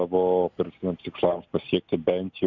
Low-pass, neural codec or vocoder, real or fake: 7.2 kHz; none; real